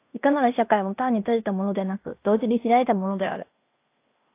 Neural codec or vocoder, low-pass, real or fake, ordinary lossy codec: codec, 16 kHz in and 24 kHz out, 0.9 kbps, LongCat-Audio-Codec, fine tuned four codebook decoder; 3.6 kHz; fake; AAC, 24 kbps